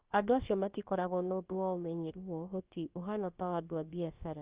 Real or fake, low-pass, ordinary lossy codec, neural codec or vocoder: fake; 3.6 kHz; Opus, 32 kbps; codec, 16 kHz, about 1 kbps, DyCAST, with the encoder's durations